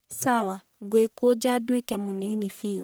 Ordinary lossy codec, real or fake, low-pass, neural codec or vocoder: none; fake; none; codec, 44.1 kHz, 1.7 kbps, Pupu-Codec